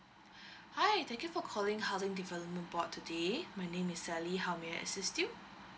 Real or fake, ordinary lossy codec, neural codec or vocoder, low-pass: real; none; none; none